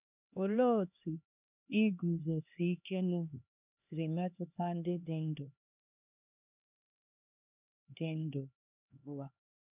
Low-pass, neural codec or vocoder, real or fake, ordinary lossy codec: 3.6 kHz; codec, 16 kHz, 2 kbps, X-Codec, HuBERT features, trained on LibriSpeech; fake; none